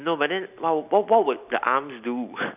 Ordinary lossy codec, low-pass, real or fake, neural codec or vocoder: none; 3.6 kHz; real; none